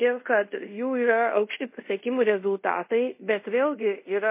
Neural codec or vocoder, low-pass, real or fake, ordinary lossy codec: codec, 24 kHz, 0.5 kbps, DualCodec; 3.6 kHz; fake; MP3, 24 kbps